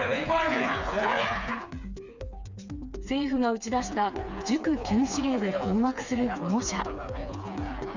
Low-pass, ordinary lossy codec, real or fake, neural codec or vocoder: 7.2 kHz; none; fake; codec, 16 kHz, 4 kbps, FreqCodec, smaller model